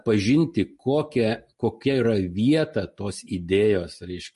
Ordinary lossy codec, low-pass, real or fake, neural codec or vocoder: MP3, 48 kbps; 14.4 kHz; real; none